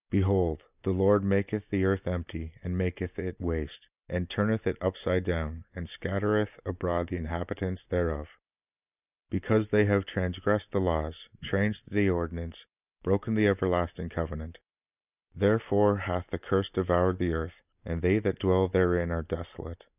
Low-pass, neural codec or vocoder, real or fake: 3.6 kHz; none; real